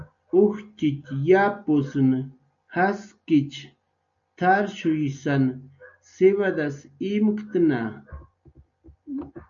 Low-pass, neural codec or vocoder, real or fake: 7.2 kHz; none; real